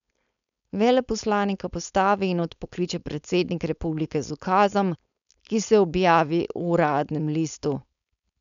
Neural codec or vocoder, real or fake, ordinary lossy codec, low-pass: codec, 16 kHz, 4.8 kbps, FACodec; fake; none; 7.2 kHz